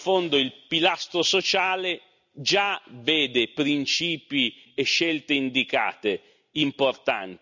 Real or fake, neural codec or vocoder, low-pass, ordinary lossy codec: real; none; 7.2 kHz; none